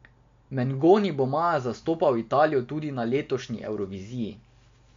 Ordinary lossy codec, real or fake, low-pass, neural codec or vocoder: MP3, 48 kbps; real; 7.2 kHz; none